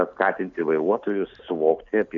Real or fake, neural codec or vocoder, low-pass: real; none; 7.2 kHz